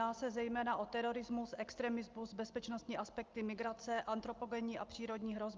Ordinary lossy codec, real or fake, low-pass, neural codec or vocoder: Opus, 24 kbps; real; 7.2 kHz; none